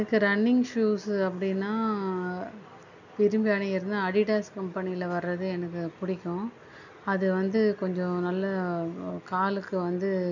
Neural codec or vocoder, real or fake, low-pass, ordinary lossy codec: none; real; 7.2 kHz; none